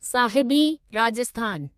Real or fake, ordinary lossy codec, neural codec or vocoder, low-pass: fake; MP3, 96 kbps; codec, 32 kHz, 1.9 kbps, SNAC; 14.4 kHz